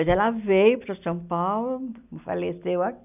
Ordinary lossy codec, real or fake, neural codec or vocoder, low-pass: none; real; none; 3.6 kHz